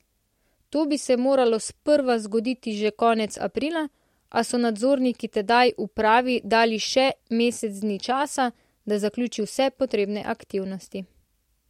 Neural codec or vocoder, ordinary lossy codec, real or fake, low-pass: none; MP3, 64 kbps; real; 19.8 kHz